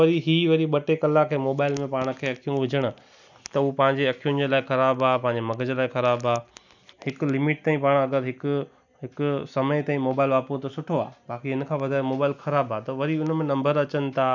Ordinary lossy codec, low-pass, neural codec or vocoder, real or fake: none; 7.2 kHz; none; real